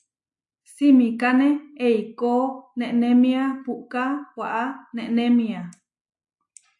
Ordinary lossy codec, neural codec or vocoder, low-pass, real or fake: AAC, 64 kbps; none; 10.8 kHz; real